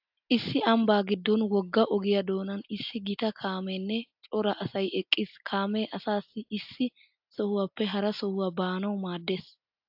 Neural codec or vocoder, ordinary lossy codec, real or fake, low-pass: none; AAC, 48 kbps; real; 5.4 kHz